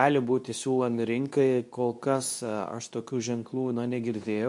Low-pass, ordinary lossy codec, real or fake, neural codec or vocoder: 10.8 kHz; MP3, 64 kbps; fake; codec, 24 kHz, 0.9 kbps, WavTokenizer, medium speech release version 2